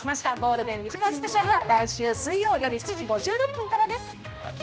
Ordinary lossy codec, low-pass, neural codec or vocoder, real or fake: none; none; codec, 16 kHz, 1 kbps, X-Codec, HuBERT features, trained on general audio; fake